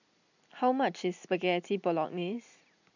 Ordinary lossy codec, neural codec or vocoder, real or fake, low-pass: none; none; real; 7.2 kHz